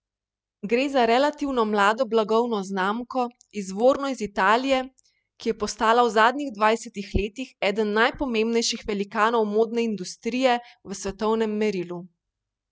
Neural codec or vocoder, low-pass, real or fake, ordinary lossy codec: none; none; real; none